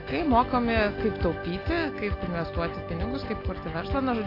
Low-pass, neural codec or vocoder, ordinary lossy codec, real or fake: 5.4 kHz; none; AAC, 24 kbps; real